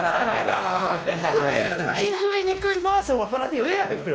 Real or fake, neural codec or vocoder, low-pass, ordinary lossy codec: fake; codec, 16 kHz, 1 kbps, X-Codec, WavLM features, trained on Multilingual LibriSpeech; none; none